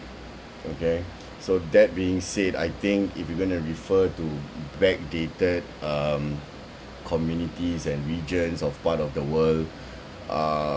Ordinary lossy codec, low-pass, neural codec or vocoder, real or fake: none; none; none; real